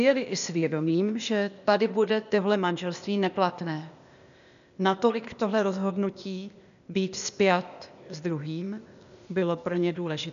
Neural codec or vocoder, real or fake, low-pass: codec, 16 kHz, 0.8 kbps, ZipCodec; fake; 7.2 kHz